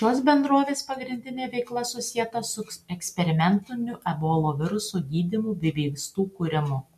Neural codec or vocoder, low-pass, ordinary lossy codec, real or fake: none; 14.4 kHz; MP3, 96 kbps; real